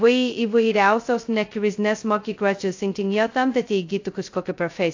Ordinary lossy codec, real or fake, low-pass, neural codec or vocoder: AAC, 48 kbps; fake; 7.2 kHz; codec, 16 kHz, 0.2 kbps, FocalCodec